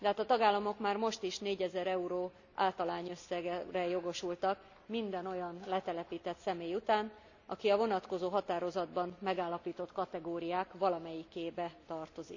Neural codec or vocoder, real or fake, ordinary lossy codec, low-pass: none; real; none; 7.2 kHz